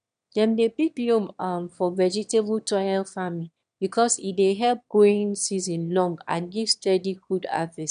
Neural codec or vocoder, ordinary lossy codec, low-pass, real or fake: autoencoder, 22.05 kHz, a latent of 192 numbers a frame, VITS, trained on one speaker; none; 9.9 kHz; fake